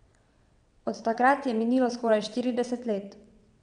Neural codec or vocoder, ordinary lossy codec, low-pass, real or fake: vocoder, 22.05 kHz, 80 mel bands, WaveNeXt; none; 9.9 kHz; fake